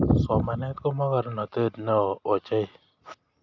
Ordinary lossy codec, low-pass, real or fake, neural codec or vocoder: none; 7.2 kHz; real; none